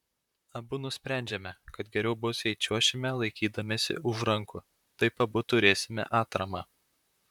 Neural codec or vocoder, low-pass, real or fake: vocoder, 44.1 kHz, 128 mel bands, Pupu-Vocoder; 19.8 kHz; fake